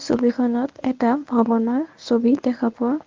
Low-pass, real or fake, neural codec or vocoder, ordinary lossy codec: 7.2 kHz; fake; codec, 24 kHz, 0.9 kbps, WavTokenizer, medium speech release version 1; Opus, 24 kbps